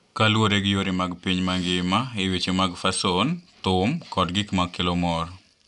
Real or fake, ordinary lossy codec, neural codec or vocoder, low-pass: real; none; none; 10.8 kHz